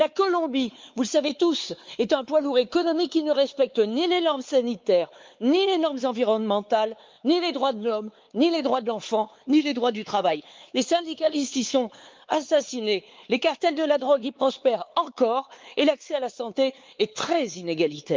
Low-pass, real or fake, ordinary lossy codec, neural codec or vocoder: 7.2 kHz; fake; Opus, 32 kbps; codec, 16 kHz, 4 kbps, X-Codec, WavLM features, trained on Multilingual LibriSpeech